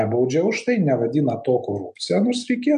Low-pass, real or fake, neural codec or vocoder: 10.8 kHz; real; none